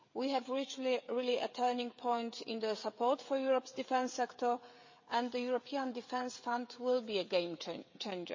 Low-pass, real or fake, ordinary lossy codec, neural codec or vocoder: 7.2 kHz; fake; MP3, 32 kbps; codec, 16 kHz, 16 kbps, FreqCodec, smaller model